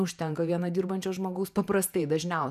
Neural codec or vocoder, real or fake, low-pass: vocoder, 48 kHz, 128 mel bands, Vocos; fake; 14.4 kHz